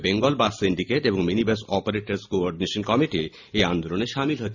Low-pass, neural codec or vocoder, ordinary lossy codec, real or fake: 7.2 kHz; none; none; real